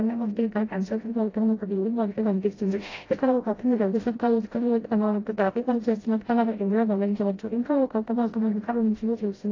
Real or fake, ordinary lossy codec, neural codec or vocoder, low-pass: fake; AAC, 32 kbps; codec, 16 kHz, 0.5 kbps, FreqCodec, smaller model; 7.2 kHz